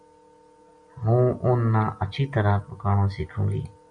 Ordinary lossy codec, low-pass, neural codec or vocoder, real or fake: MP3, 96 kbps; 9.9 kHz; none; real